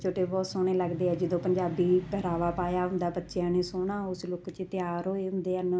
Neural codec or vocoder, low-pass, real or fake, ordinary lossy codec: none; none; real; none